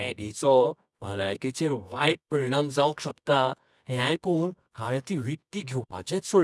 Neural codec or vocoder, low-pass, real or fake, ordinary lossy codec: codec, 24 kHz, 0.9 kbps, WavTokenizer, medium music audio release; none; fake; none